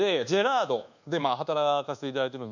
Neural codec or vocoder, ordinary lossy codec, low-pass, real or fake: codec, 24 kHz, 1.2 kbps, DualCodec; none; 7.2 kHz; fake